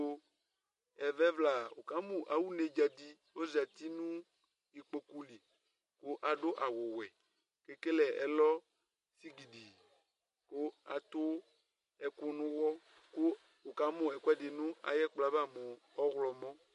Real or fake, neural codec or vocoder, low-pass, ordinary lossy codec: real; none; 14.4 kHz; MP3, 48 kbps